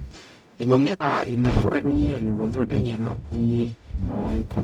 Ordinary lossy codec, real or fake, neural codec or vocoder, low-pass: none; fake; codec, 44.1 kHz, 0.9 kbps, DAC; 19.8 kHz